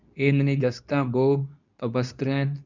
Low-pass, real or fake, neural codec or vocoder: 7.2 kHz; fake; codec, 24 kHz, 0.9 kbps, WavTokenizer, medium speech release version 2